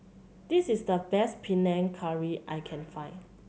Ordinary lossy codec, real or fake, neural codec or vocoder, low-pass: none; real; none; none